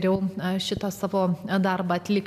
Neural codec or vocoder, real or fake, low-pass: none; real; 14.4 kHz